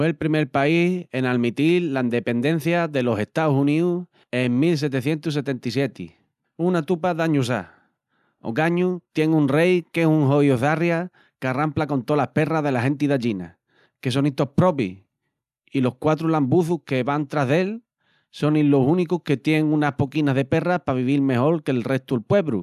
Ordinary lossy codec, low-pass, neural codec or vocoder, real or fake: none; 14.4 kHz; none; real